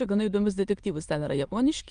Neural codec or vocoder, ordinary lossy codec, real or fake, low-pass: autoencoder, 22.05 kHz, a latent of 192 numbers a frame, VITS, trained on many speakers; Opus, 32 kbps; fake; 9.9 kHz